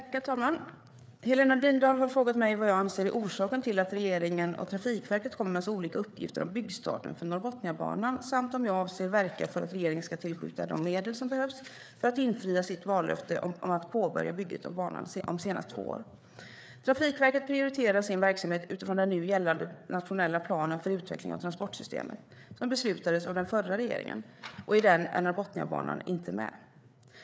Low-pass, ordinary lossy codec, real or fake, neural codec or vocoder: none; none; fake; codec, 16 kHz, 4 kbps, FreqCodec, larger model